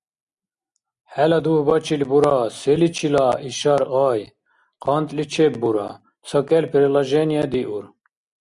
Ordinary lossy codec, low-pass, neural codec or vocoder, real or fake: Opus, 64 kbps; 10.8 kHz; none; real